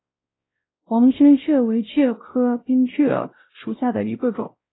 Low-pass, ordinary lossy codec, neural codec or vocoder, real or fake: 7.2 kHz; AAC, 16 kbps; codec, 16 kHz, 0.5 kbps, X-Codec, WavLM features, trained on Multilingual LibriSpeech; fake